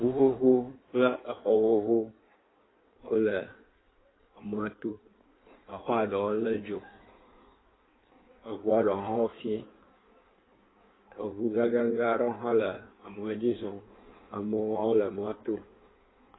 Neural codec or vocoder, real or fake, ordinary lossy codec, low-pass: codec, 16 kHz in and 24 kHz out, 1.1 kbps, FireRedTTS-2 codec; fake; AAC, 16 kbps; 7.2 kHz